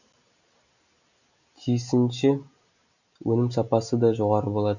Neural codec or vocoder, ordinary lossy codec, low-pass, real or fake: none; none; 7.2 kHz; real